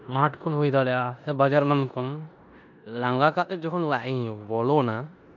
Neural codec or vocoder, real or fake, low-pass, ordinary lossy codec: codec, 16 kHz in and 24 kHz out, 0.9 kbps, LongCat-Audio-Codec, four codebook decoder; fake; 7.2 kHz; none